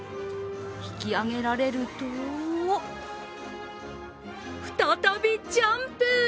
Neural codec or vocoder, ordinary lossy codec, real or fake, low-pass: none; none; real; none